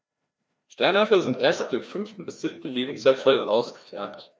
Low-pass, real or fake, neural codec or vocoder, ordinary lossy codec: none; fake; codec, 16 kHz, 1 kbps, FreqCodec, larger model; none